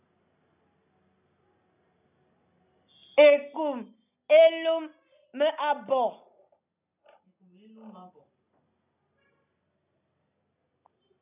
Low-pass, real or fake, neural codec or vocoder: 3.6 kHz; real; none